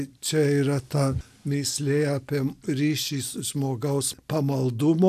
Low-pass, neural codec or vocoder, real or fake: 14.4 kHz; none; real